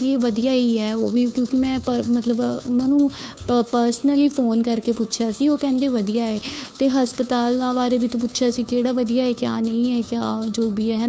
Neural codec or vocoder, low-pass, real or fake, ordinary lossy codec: codec, 16 kHz, 2 kbps, FunCodec, trained on Chinese and English, 25 frames a second; none; fake; none